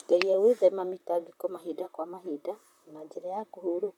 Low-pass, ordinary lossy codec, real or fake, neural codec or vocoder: 19.8 kHz; none; fake; vocoder, 44.1 kHz, 128 mel bands, Pupu-Vocoder